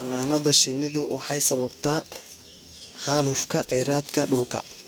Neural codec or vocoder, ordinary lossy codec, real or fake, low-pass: codec, 44.1 kHz, 2.6 kbps, DAC; none; fake; none